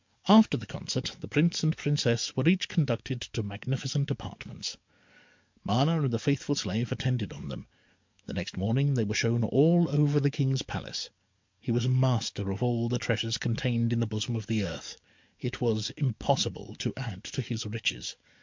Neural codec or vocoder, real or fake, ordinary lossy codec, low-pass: codec, 44.1 kHz, 7.8 kbps, DAC; fake; MP3, 64 kbps; 7.2 kHz